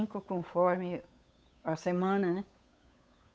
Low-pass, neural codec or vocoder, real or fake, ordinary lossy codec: none; codec, 16 kHz, 8 kbps, FunCodec, trained on Chinese and English, 25 frames a second; fake; none